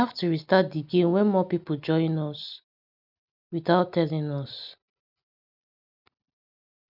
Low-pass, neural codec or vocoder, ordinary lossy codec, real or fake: 5.4 kHz; none; none; real